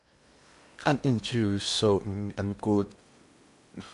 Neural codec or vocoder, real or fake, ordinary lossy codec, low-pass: codec, 16 kHz in and 24 kHz out, 0.8 kbps, FocalCodec, streaming, 65536 codes; fake; AAC, 96 kbps; 10.8 kHz